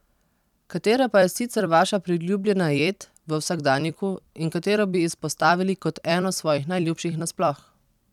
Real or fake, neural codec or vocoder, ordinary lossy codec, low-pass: fake; vocoder, 44.1 kHz, 128 mel bands every 256 samples, BigVGAN v2; none; 19.8 kHz